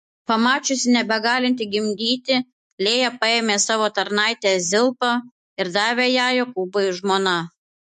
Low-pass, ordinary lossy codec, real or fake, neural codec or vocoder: 14.4 kHz; MP3, 48 kbps; fake; autoencoder, 48 kHz, 128 numbers a frame, DAC-VAE, trained on Japanese speech